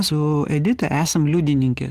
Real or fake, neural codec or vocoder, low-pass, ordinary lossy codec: real; none; 14.4 kHz; Opus, 16 kbps